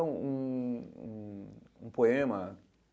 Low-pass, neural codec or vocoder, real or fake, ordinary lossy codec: none; none; real; none